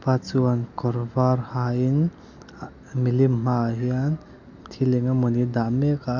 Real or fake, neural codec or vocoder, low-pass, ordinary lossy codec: real; none; 7.2 kHz; MP3, 64 kbps